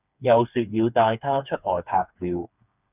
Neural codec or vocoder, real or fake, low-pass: codec, 16 kHz, 4 kbps, FreqCodec, smaller model; fake; 3.6 kHz